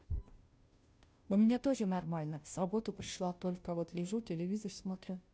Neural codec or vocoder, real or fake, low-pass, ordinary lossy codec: codec, 16 kHz, 0.5 kbps, FunCodec, trained on Chinese and English, 25 frames a second; fake; none; none